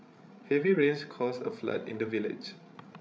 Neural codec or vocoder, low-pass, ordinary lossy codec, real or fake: codec, 16 kHz, 16 kbps, FreqCodec, larger model; none; none; fake